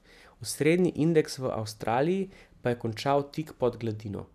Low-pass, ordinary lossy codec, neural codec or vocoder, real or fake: 14.4 kHz; none; none; real